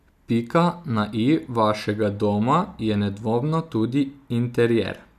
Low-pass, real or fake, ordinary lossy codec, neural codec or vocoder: 14.4 kHz; real; none; none